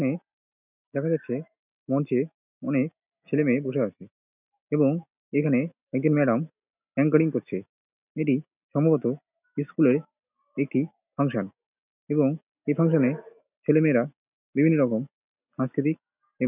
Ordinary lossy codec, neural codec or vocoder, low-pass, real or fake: none; none; 3.6 kHz; real